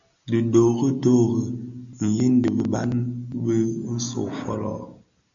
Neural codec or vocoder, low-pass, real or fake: none; 7.2 kHz; real